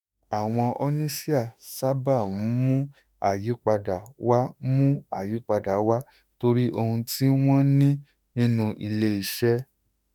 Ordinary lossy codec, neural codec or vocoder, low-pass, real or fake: none; autoencoder, 48 kHz, 32 numbers a frame, DAC-VAE, trained on Japanese speech; none; fake